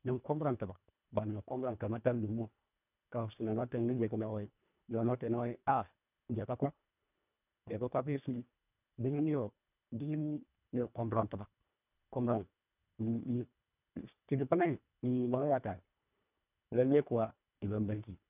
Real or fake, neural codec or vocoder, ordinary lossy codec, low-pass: fake; codec, 24 kHz, 1.5 kbps, HILCodec; none; 3.6 kHz